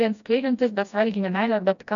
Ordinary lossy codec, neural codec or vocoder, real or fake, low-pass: MP3, 96 kbps; codec, 16 kHz, 1 kbps, FreqCodec, smaller model; fake; 7.2 kHz